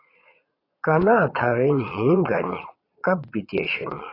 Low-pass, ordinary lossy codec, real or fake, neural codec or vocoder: 5.4 kHz; AAC, 24 kbps; fake; vocoder, 22.05 kHz, 80 mel bands, Vocos